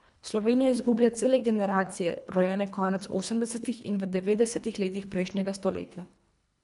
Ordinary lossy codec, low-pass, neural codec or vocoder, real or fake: MP3, 96 kbps; 10.8 kHz; codec, 24 kHz, 1.5 kbps, HILCodec; fake